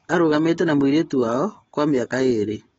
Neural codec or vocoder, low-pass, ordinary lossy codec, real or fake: codec, 44.1 kHz, 7.8 kbps, DAC; 19.8 kHz; AAC, 24 kbps; fake